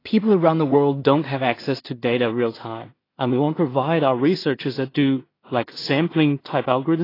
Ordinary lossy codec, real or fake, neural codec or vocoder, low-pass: AAC, 24 kbps; fake; codec, 16 kHz in and 24 kHz out, 0.4 kbps, LongCat-Audio-Codec, two codebook decoder; 5.4 kHz